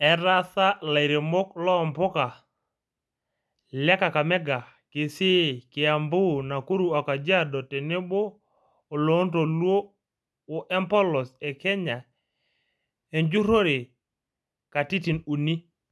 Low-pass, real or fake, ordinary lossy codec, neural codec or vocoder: none; real; none; none